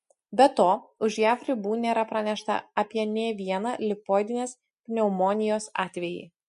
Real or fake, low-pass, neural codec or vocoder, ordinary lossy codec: real; 14.4 kHz; none; MP3, 48 kbps